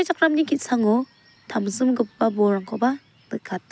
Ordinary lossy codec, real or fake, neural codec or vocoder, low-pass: none; real; none; none